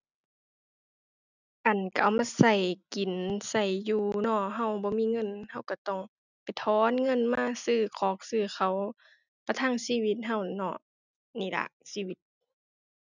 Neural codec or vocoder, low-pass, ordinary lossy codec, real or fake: none; 7.2 kHz; none; real